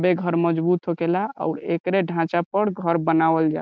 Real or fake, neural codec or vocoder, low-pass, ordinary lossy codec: real; none; none; none